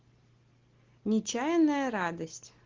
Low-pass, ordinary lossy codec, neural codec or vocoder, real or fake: 7.2 kHz; Opus, 16 kbps; none; real